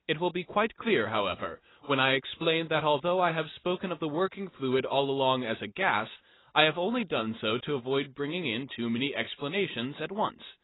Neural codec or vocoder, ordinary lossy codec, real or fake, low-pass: none; AAC, 16 kbps; real; 7.2 kHz